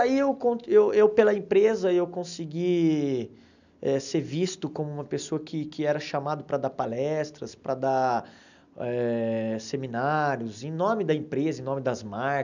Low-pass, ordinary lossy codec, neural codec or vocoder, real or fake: 7.2 kHz; none; none; real